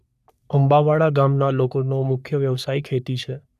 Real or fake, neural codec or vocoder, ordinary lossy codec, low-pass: fake; codec, 44.1 kHz, 3.4 kbps, Pupu-Codec; none; 14.4 kHz